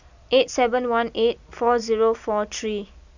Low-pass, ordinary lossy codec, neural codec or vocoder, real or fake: 7.2 kHz; none; none; real